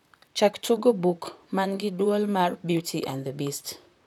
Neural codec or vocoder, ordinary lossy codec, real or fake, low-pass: vocoder, 44.1 kHz, 128 mel bands, Pupu-Vocoder; none; fake; 19.8 kHz